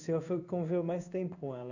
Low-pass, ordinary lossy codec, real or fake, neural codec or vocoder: 7.2 kHz; none; fake; codec, 16 kHz in and 24 kHz out, 1 kbps, XY-Tokenizer